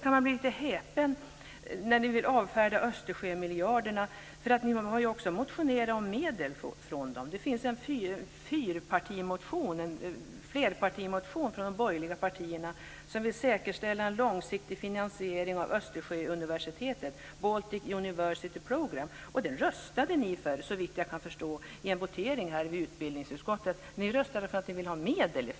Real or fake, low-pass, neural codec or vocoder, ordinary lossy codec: real; none; none; none